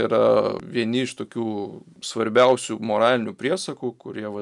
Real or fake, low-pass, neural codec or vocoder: real; 10.8 kHz; none